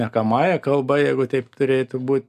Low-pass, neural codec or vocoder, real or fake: 14.4 kHz; none; real